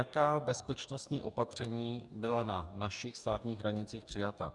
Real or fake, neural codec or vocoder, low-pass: fake; codec, 44.1 kHz, 2.6 kbps, DAC; 10.8 kHz